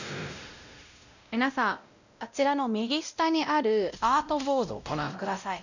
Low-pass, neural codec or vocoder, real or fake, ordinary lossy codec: 7.2 kHz; codec, 16 kHz, 0.5 kbps, X-Codec, WavLM features, trained on Multilingual LibriSpeech; fake; none